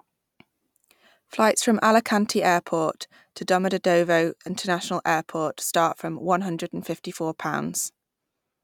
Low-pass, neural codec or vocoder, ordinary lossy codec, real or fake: 19.8 kHz; none; none; real